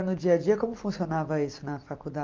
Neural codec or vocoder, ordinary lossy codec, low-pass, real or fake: none; Opus, 32 kbps; 7.2 kHz; real